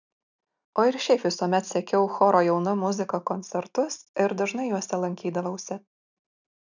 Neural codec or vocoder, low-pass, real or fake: none; 7.2 kHz; real